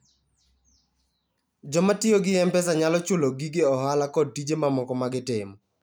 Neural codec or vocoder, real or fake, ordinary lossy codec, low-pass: none; real; none; none